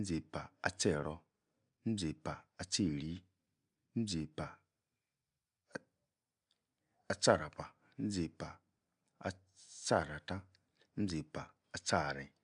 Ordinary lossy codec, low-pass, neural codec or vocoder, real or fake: none; 9.9 kHz; none; real